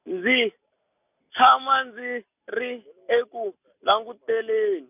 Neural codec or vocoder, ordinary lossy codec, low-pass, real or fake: none; none; 3.6 kHz; real